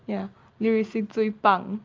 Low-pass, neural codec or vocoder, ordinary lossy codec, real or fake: 7.2 kHz; none; Opus, 32 kbps; real